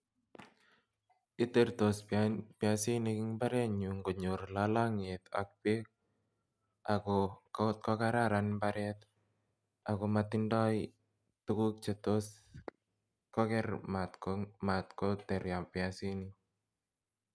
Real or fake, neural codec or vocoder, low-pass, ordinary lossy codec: real; none; none; none